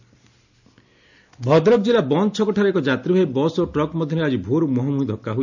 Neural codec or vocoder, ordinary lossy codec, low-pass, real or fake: none; none; 7.2 kHz; real